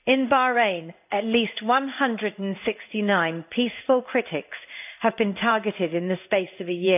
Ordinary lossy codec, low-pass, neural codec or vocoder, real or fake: none; 3.6 kHz; codec, 16 kHz in and 24 kHz out, 1 kbps, XY-Tokenizer; fake